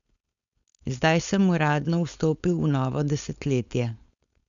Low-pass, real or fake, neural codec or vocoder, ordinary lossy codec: 7.2 kHz; fake; codec, 16 kHz, 4.8 kbps, FACodec; none